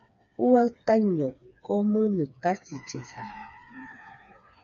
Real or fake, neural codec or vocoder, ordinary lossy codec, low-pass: fake; codec, 16 kHz, 4 kbps, FreqCodec, smaller model; MP3, 96 kbps; 7.2 kHz